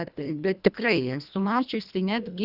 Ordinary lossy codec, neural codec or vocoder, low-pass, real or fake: Opus, 64 kbps; codec, 24 kHz, 1.5 kbps, HILCodec; 5.4 kHz; fake